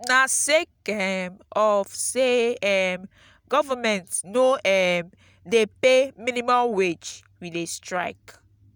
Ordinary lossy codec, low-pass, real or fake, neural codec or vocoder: none; none; real; none